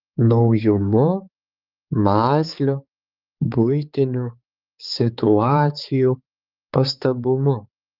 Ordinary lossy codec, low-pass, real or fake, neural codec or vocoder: Opus, 32 kbps; 5.4 kHz; fake; codec, 16 kHz, 4 kbps, X-Codec, HuBERT features, trained on balanced general audio